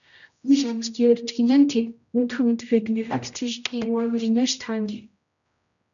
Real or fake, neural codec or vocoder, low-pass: fake; codec, 16 kHz, 0.5 kbps, X-Codec, HuBERT features, trained on general audio; 7.2 kHz